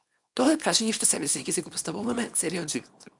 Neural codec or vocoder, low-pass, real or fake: codec, 24 kHz, 0.9 kbps, WavTokenizer, small release; 10.8 kHz; fake